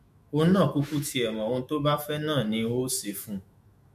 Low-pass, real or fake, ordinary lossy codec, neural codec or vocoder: 14.4 kHz; fake; MP3, 64 kbps; autoencoder, 48 kHz, 128 numbers a frame, DAC-VAE, trained on Japanese speech